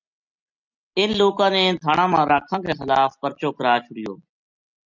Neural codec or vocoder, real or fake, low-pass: none; real; 7.2 kHz